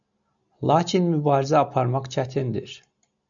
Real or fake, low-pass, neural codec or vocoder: real; 7.2 kHz; none